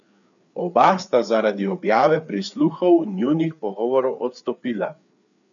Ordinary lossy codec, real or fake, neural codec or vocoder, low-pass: none; fake; codec, 16 kHz, 4 kbps, FreqCodec, larger model; 7.2 kHz